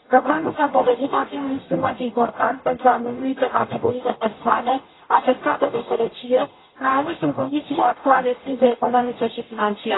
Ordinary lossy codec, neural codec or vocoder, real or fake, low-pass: AAC, 16 kbps; codec, 44.1 kHz, 0.9 kbps, DAC; fake; 7.2 kHz